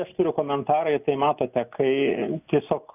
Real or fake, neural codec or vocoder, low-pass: real; none; 3.6 kHz